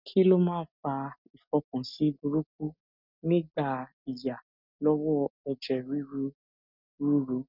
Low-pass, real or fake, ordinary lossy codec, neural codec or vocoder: 5.4 kHz; real; none; none